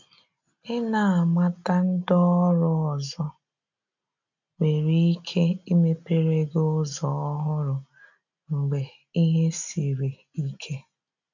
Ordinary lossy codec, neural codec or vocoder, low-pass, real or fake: none; none; 7.2 kHz; real